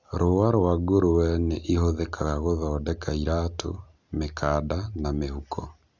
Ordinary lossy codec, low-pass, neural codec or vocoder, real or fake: none; 7.2 kHz; none; real